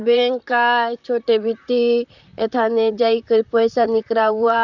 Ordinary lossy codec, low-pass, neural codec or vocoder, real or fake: none; 7.2 kHz; vocoder, 44.1 kHz, 128 mel bands, Pupu-Vocoder; fake